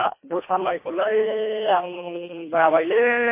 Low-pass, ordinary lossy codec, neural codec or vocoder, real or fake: 3.6 kHz; MP3, 24 kbps; codec, 24 kHz, 1.5 kbps, HILCodec; fake